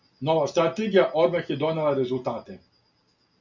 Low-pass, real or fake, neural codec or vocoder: 7.2 kHz; real; none